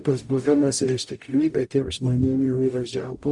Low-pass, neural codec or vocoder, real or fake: 10.8 kHz; codec, 44.1 kHz, 0.9 kbps, DAC; fake